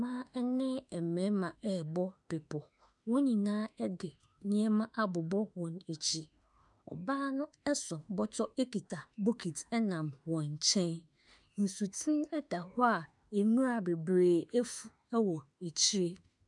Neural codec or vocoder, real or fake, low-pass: autoencoder, 48 kHz, 32 numbers a frame, DAC-VAE, trained on Japanese speech; fake; 10.8 kHz